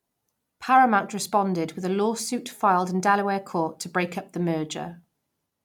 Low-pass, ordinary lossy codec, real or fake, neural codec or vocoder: 19.8 kHz; none; real; none